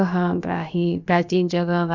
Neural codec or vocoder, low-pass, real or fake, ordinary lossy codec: codec, 16 kHz, about 1 kbps, DyCAST, with the encoder's durations; 7.2 kHz; fake; none